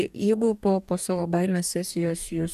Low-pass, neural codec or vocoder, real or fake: 14.4 kHz; codec, 44.1 kHz, 2.6 kbps, DAC; fake